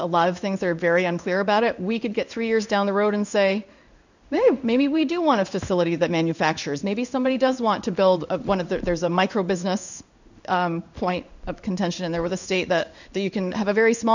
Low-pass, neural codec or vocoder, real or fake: 7.2 kHz; codec, 16 kHz in and 24 kHz out, 1 kbps, XY-Tokenizer; fake